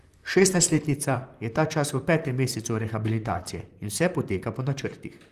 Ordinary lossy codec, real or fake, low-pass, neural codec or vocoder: Opus, 32 kbps; fake; 14.4 kHz; vocoder, 44.1 kHz, 128 mel bands, Pupu-Vocoder